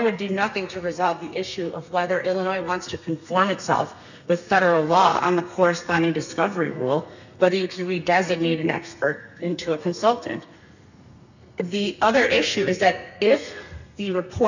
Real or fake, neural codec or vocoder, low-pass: fake; codec, 32 kHz, 1.9 kbps, SNAC; 7.2 kHz